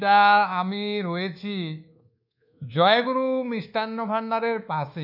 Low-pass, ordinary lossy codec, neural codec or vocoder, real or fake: 5.4 kHz; none; codec, 24 kHz, 1.2 kbps, DualCodec; fake